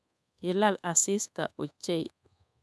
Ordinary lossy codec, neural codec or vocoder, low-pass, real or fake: none; codec, 24 kHz, 1.2 kbps, DualCodec; none; fake